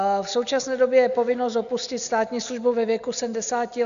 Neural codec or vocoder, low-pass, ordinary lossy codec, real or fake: none; 7.2 kHz; MP3, 96 kbps; real